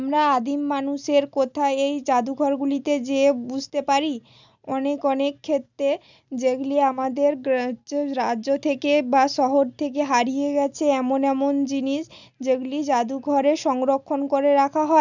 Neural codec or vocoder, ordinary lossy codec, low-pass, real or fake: none; none; 7.2 kHz; real